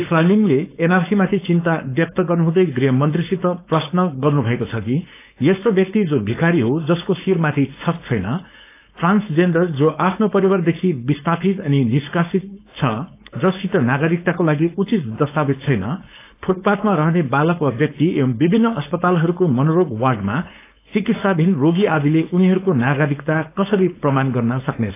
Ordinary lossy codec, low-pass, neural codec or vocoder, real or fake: AAC, 24 kbps; 3.6 kHz; codec, 16 kHz, 4.8 kbps, FACodec; fake